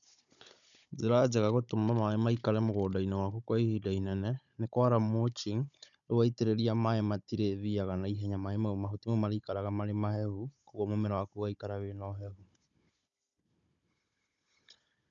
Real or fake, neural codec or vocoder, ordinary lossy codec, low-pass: fake; codec, 16 kHz, 16 kbps, FunCodec, trained on Chinese and English, 50 frames a second; none; 7.2 kHz